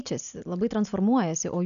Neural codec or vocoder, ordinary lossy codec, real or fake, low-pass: none; MP3, 96 kbps; real; 7.2 kHz